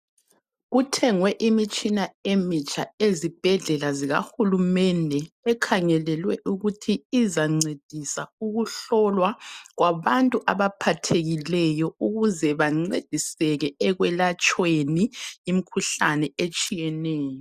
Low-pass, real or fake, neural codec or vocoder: 14.4 kHz; real; none